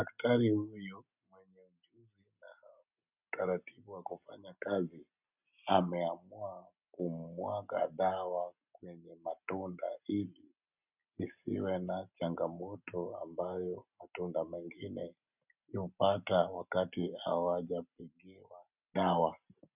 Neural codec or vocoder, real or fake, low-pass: none; real; 3.6 kHz